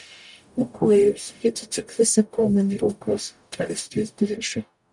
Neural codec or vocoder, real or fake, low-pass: codec, 44.1 kHz, 0.9 kbps, DAC; fake; 10.8 kHz